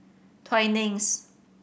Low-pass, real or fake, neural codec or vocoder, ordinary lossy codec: none; real; none; none